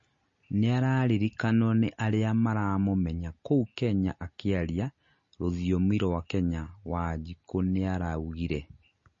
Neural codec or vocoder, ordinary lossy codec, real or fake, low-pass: none; MP3, 32 kbps; real; 7.2 kHz